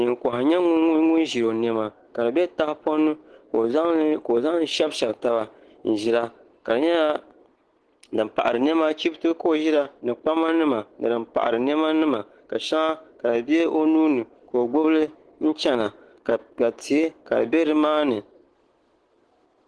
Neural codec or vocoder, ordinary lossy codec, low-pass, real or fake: none; Opus, 16 kbps; 10.8 kHz; real